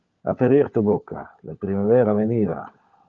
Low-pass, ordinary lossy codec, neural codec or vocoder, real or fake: 7.2 kHz; Opus, 32 kbps; codec, 16 kHz, 16 kbps, FunCodec, trained on LibriTTS, 50 frames a second; fake